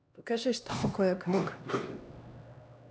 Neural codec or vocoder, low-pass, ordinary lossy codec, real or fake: codec, 16 kHz, 1 kbps, X-Codec, HuBERT features, trained on LibriSpeech; none; none; fake